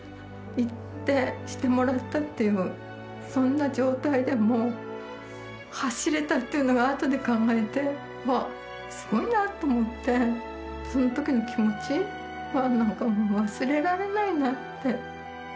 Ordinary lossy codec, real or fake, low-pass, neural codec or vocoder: none; real; none; none